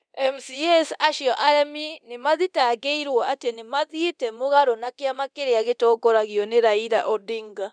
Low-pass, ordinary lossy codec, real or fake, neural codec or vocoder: 9.9 kHz; none; fake; codec, 24 kHz, 0.9 kbps, DualCodec